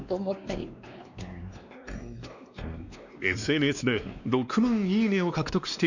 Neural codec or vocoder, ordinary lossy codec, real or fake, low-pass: codec, 16 kHz, 2 kbps, X-Codec, WavLM features, trained on Multilingual LibriSpeech; none; fake; 7.2 kHz